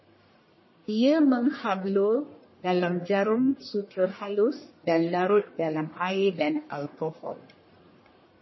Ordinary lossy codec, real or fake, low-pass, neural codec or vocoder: MP3, 24 kbps; fake; 7.2 kHz; codec, 44.1 kHz, 1.7 kbps, Pupu-Codec